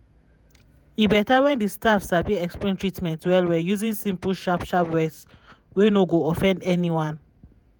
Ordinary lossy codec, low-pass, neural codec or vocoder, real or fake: none; none; vocoder, 48 kHz, 128 mel bands, Vocos; fake